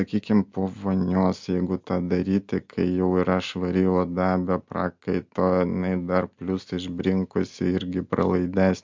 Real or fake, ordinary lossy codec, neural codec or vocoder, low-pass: real; MP3, 64 kbps; none; 7.2 kHz